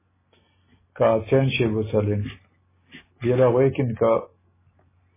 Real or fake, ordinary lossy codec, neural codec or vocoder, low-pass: real; MP3, 16 kbps; none; 3.6 kHz